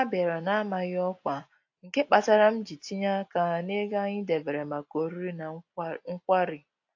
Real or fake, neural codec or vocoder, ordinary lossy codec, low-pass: real; none; AAC, 48 kbps; 7.2 kHz